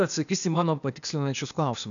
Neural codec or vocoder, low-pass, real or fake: codec, 16 kHz, 0.8 kbps, ZipCodec; 7.2 kHz; fake